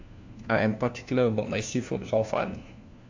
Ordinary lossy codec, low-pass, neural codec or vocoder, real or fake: AAC, 48 kbps; 7.2 kHz; codec, 16 kHz, 1 kbps, FunCodec, trained on LibriTTS, 50 frames a second; fake